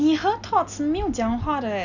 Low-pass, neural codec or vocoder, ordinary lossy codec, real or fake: 7.2 kHz; none; none; real